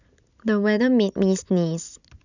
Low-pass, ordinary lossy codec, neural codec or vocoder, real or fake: 7.2 kHz; none; none; real